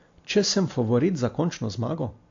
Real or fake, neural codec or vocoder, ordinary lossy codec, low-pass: real; none; AAC, 48 kbps; 7.2 kHz